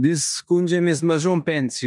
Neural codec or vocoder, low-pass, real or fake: codec, 16 kHz in and 24 kHz out, 0.9 kbps, LongCat-Audio-Codec, four codebook decoder; 10.8 kHz; fake